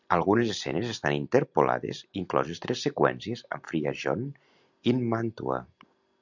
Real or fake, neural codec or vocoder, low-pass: real; none; 7.2 kHz